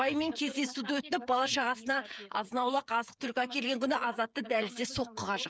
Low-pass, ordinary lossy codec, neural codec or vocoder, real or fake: none; none; codec, 16 kHz, 8 kbps, FreqCodec, smaller model; fake